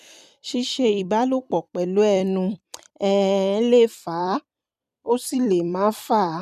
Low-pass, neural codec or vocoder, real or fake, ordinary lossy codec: 14.4 kHz; vocoder, 44.1 kHz, 128 mel bands every 256 samples, BigVGAN v2; fake; none